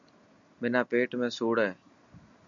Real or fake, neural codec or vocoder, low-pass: real; none; 7.2 kHz